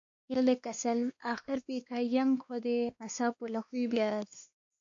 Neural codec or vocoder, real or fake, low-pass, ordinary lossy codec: codec, 16 kHz, 2 kbps, X-Codec, WavLM features, trained on Multilingual LibriSpeech; fake; 7.2 kHz; MP3, 48 kbps